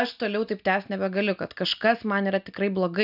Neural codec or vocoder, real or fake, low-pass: none; real; 5.4 kHz